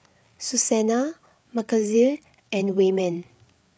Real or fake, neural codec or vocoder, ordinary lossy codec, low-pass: fake; codec, 16 kHz, 8 kbps, FreqCodec, larger model; none; none